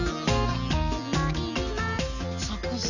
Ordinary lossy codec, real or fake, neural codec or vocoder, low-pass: none; real; none; 7.2 kHz